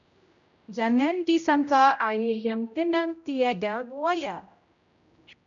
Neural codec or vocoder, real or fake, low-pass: codec, 16 kHz, 0.5 kbps, X-Codec, HuBERT features, trained on general audio; fake; 7.2 kHz